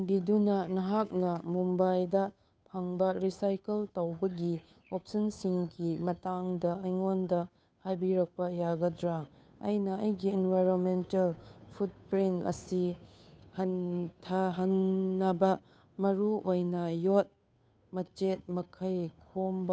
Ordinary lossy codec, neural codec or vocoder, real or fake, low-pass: none; codec, 16 kHz, 2 kbps, FunCodec, trained on Chinese and English, 25 frames a second; fake; none